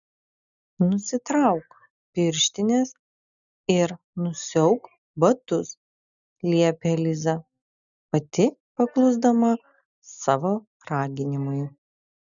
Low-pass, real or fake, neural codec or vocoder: 7.2 kHz; real; none